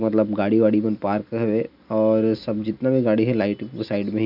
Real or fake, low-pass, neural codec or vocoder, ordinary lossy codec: real; 5.4 kHz; none; none